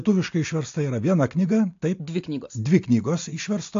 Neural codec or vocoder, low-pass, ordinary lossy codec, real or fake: none; 7.2 kHz; AAC, 48 kbps; real